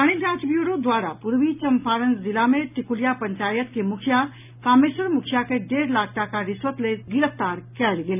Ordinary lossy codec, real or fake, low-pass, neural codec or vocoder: none; real; 3.6 kHz; none